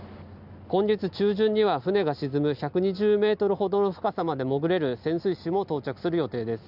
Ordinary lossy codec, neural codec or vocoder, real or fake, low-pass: none; none; real; 5.4 kHz